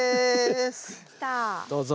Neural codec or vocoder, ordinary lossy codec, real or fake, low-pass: none; none; real; none